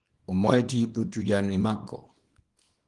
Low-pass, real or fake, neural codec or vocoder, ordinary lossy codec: 10.8 kHz; fake; codec, 24 kHz, 0.9 kbps, WavTokenizer, small release; Opus, 24 kbps